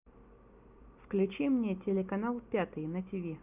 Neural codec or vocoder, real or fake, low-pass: none; real; 3.6 kHz